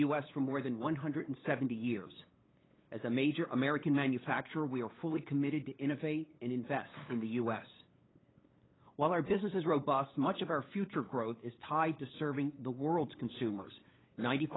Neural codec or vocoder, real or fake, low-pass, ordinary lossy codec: codec, 16 kHz, 8 kbps, FunCodec, trained on LibriTTS, 25 frames a second; fake; 7.2 kHz; AAC, 16 kbps